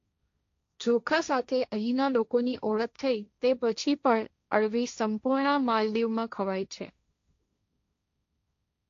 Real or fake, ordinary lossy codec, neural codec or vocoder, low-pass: fake; AAC, 48 kbps; codec, 16 kHz, 1.1 kbps, Voila-Tokenizer; 7.2 kHz